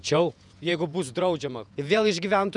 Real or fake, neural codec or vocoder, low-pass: fake; vocoder, 48 kHz, 128 mel bands, Vocos; 10.8 kHz